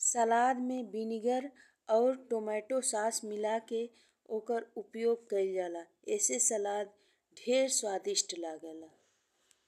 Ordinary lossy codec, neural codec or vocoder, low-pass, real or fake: none; none; 14.4 kHz; real